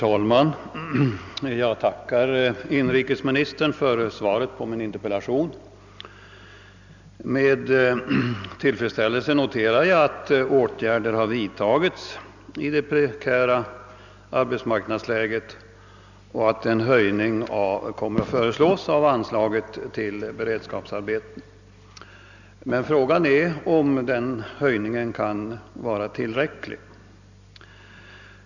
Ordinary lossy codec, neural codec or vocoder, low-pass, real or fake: none; none; 7.2 kHz; real